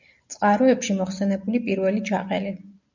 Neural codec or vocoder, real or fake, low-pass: none; real; 7.2 kHz